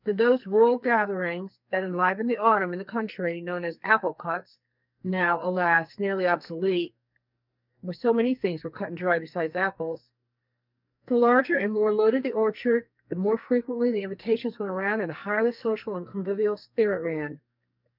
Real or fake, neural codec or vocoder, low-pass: fake; codec, 44.1 kHz, 2.6 kbps, SNAC; 5.4 kHz